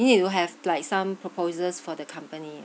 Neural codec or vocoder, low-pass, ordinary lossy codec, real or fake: none; none; none; real